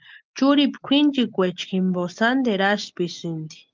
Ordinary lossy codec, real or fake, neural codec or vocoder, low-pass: Opus, 32 kbps; real; none; 7.2 kHz